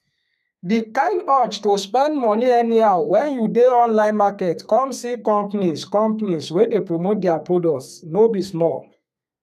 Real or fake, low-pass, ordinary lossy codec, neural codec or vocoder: fake; 14.4 kHz; none; codec, 32 kHz, 1.9 kbps, SNAC